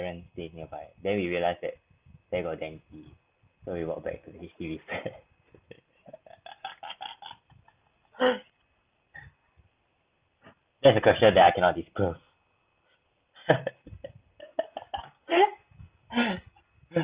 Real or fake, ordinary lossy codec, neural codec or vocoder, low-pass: real; Opus, 24 kbps; none; 3.6 kHz